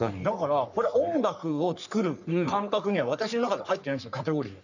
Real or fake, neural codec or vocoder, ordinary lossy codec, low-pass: fake; codec, 44.1 kHz, 3.4 kbps, Pupu-Codec; none; 7.2 kHz